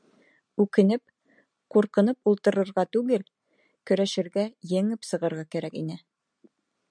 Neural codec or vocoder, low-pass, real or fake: none; 9.9 kHz; real